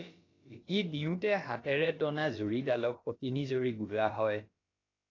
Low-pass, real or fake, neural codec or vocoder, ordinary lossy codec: 7.2 kHz; fake; codec, 16 kHz, about 1 kbps, DyCAST, with the encoder's durations; AAC, 32 kbps